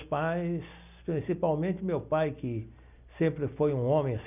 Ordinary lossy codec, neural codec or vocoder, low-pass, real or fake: none; none; 3.6 kHz; real